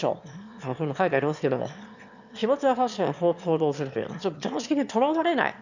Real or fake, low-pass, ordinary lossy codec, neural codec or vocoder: fake; 7.2 kHz; none; autoencoder, 22.05 kHz, a latent of 192 numbers a frame, VITS, trained on one speaker